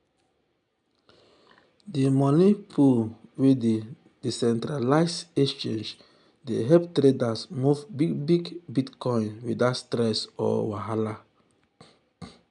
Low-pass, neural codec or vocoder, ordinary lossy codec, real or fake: 10.8 kHz; none; none; real